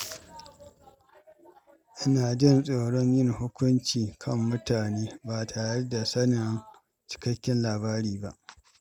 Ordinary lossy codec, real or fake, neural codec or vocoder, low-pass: none; real; none; 19.8 kHz